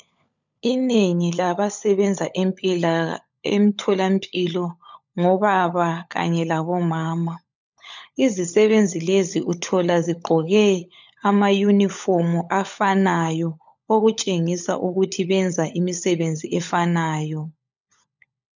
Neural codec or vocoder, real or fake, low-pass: codec, 16 kHz, 16 kbps, FunCodec, trained on LibriTTS, 50 frames a second; fake; 7.2 kHz